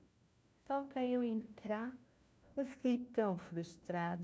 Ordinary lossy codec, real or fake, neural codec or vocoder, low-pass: none; fake; codec, 16 kHz, 1 kbps, FunCodec, trained on LibriTTS, 50 frames a second; none